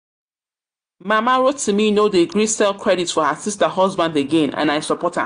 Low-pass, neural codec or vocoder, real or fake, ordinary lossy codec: 9.9 kHz; none; real; AAC, 64 kbps